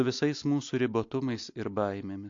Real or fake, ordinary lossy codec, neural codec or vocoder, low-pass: real; AAC, 48 kbps; none; 7.2 kHz